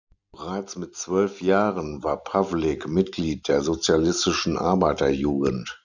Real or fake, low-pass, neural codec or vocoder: real; 7.2 kHz; none